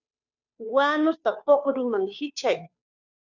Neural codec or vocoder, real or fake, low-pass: codec, 16 kHz, 2 kbps, FunCodec, trained on Chinese and English, 25 frames a second; fake; 7.2 kHz